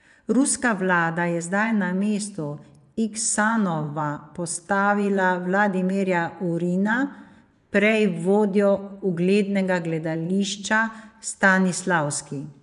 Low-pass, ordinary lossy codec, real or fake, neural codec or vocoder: 10.8 kHz; none; fake; vocoder, 24 kHz, 100 mel bands, Vocos